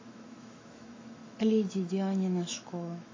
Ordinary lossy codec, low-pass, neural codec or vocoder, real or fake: AAC, 32 kbps; 7.2 kHz; autoencoder, 48 kHz, 128 numbers a frame, DAC-VAE, trained on Japanese speech; fake